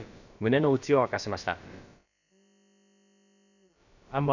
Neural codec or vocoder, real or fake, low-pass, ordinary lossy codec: codec, 16 kHz, about 1 kbps, DyCAST, with the encoder's durations; fake; 7.2 kHz; none